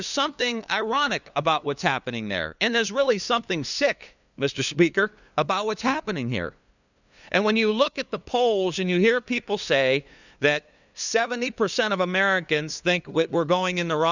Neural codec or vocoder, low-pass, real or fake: codec, 16 kHz, 2 kbps, FunCodec, trained on LibriTTS, 25 frames a second; 7.2 kHz; fake